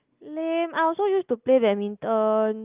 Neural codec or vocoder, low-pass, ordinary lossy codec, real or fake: none; 3.6 kHz; Opus, 24 kbps; real